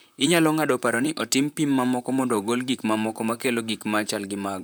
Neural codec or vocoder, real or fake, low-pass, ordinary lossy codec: vocoder, 44.1 kHz, 128 mel bands, Pupu-Vocoder; fake; none; none